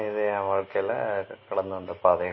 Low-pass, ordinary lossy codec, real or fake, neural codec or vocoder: 7.2 kHz; MP3, 24 kbps; real; none